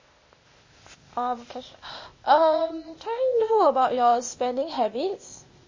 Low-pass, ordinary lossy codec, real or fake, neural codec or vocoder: 7.2 kHz; MP3, 32 kbps; fake; codec, 16 kHz, 0.8 kbps, ZipCodec